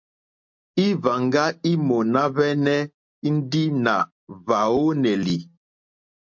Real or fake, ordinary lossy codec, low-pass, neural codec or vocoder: real; MP3, 48 kbps; 7.2 kHz; none